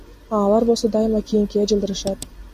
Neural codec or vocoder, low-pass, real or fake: none; 14.4 kHz; real